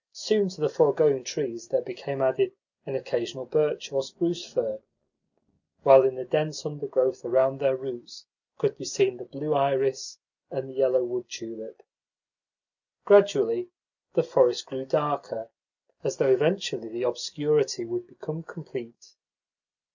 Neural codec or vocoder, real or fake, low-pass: none; real; 7.2 kHz